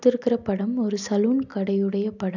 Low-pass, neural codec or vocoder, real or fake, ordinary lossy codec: 7.2 kHz; none; real; none